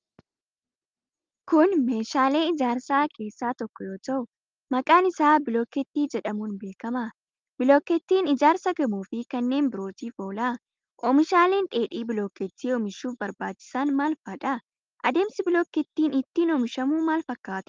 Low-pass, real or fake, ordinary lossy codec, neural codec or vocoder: 7.2 kHz; real; Opus, 24 kbps; none